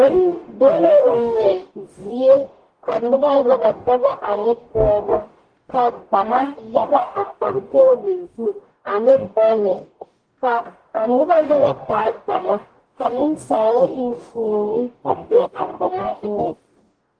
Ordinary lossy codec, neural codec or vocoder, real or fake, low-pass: Opus, 24 kbps; codec, 44.1 kHz, 0.9 kbps, DAC; fake; 9.9 kHz